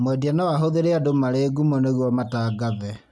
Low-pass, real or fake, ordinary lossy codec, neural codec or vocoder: none; real; none; none